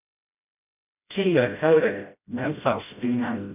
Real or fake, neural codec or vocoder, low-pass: fake; codec, 16 kHz, 0.5 kbps, FreqCodec, smaller model; 3.6 kHz